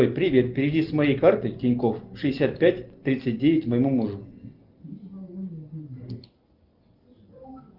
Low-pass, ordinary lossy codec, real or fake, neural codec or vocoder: 5.4 kHz; Opus, 32 kbps; real; none